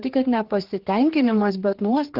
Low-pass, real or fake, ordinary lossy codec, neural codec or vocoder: 5.4 kHz; fake; Opus, 16 kbps; codec, 16 kHz, 4 kbps, X-Codec, HuBERT features, trained on general audio